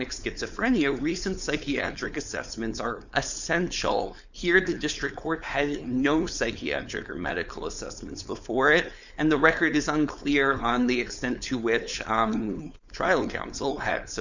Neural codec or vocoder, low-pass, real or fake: codec, 16 kHz, 4.8 kbps, FACodec; 7.2 kHz; fake